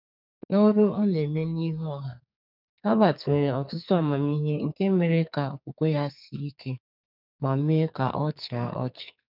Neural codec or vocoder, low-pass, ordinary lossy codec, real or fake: codec, 44.1 kHz, 2.6 kbps, SNAC; 5.4 kHz; none; fake